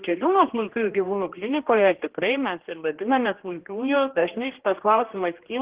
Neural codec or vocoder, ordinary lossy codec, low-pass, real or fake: codec, 16 kHz, 1 kbps, X-Codec, HuBERT features, trained on general audio; Opus, 16 kbps; 3.6 kHz; fake